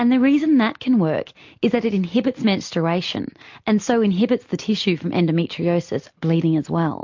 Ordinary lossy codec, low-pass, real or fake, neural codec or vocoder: MP3, 48 kbps; 7.2 kHz; real; none